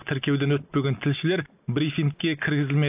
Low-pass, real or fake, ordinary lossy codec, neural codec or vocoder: 3.6 kHz; real; AAC, 32 kbps; none